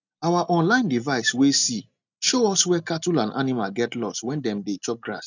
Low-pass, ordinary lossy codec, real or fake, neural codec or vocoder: 7.2 kHz; none; real; none